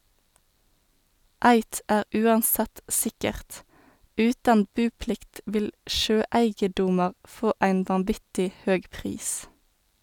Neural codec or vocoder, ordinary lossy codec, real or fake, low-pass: none; none; real; 19.8 kHz